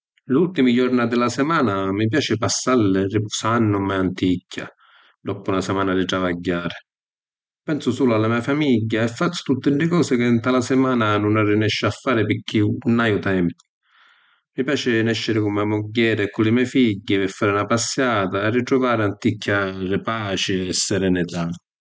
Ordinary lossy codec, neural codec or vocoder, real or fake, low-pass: none; none; real; none